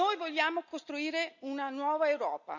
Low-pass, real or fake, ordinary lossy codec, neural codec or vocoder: 7.2 kHz; real; none; none